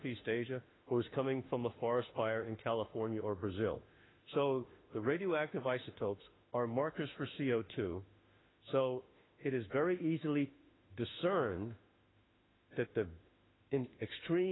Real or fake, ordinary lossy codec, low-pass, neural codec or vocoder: fake; AAC, 16 kbps; 7.2 kHz; autoencoder, 48 kHz, 32 numbers a frame, DAC-VAE, trained on Japanese speech